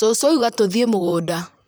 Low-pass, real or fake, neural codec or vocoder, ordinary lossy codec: none; fake; vocoder, 44.1 kHz, 128 mel bands, Pupu-Vocoder; none